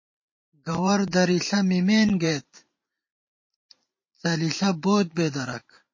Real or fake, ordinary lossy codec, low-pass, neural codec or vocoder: fake; MP3, 32 kbps; 7.2 kHz; vocoder, 44.1 kHz, 128 mel bands every 512 samples, BigVGAN v2